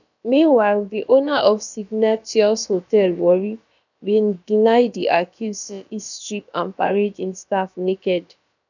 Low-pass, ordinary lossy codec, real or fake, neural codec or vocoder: 7.2 kHz; none; fake; codec, 16 kHz, about 1 kbps, DyCAST, with the encoder's durations